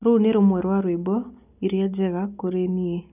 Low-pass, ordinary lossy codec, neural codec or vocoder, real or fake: 3.6 kHz; none; none; real